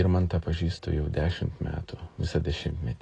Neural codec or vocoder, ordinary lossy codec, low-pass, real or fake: none; AAC, 32 kbps; 10.8 kHz; real